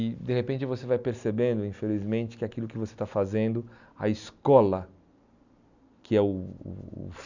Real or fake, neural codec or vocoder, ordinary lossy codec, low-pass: real; none; none; 7.2 kHz